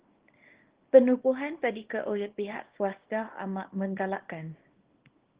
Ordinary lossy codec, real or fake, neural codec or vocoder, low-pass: Opus, 16 kbps; fake; codec, 24 kHz, 0.9 kbps, WavTokenizer, small release; 3.6 kHz